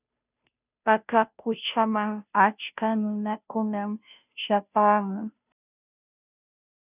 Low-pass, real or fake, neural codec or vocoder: 3.6 kHz; fake; codec, 16 kHz, 0.5 kbps, FunCodec, trained on Chinese and English, 25 frames a second